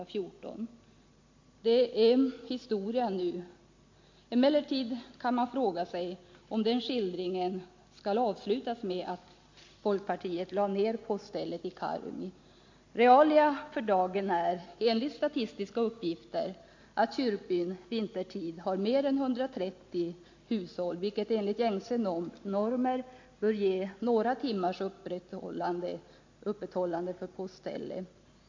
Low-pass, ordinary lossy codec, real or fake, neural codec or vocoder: 7.2 kHz; MP3, 48 kbps; real; none